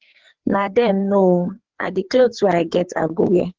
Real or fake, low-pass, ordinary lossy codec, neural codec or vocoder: fake; 7.2 kHz; Opus, 16 kbps; codec, 16 kHz, 4 kbps, FreqCodec, larger model